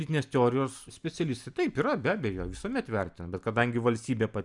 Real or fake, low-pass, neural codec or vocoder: real; 10.8 kHz; none